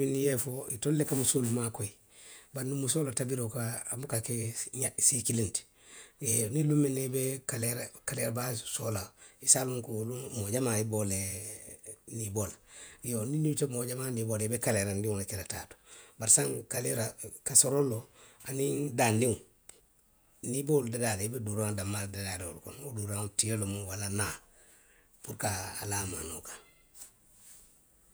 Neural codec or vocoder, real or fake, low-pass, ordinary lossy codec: vocoder, 48 kHz, 128 mel bands, Vocos; fake; none; none